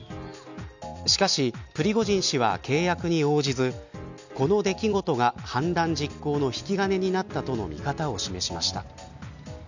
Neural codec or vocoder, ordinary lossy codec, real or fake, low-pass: none; none; real; 7.2 kHz